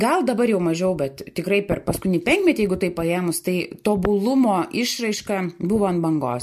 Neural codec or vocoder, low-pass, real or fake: none; 14.4 kHz; real